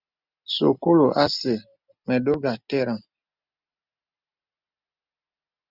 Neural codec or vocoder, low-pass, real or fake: none; 5.4 kHz; real